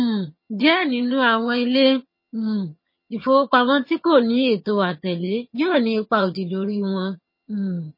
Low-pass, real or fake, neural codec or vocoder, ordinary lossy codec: 5.4 kHz; fake; vocoder, 22.05 kHz, 80 mel bands, HiFi-GAN; MP3, 24 kbps